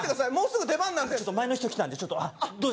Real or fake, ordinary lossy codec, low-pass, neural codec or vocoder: real; none; none; none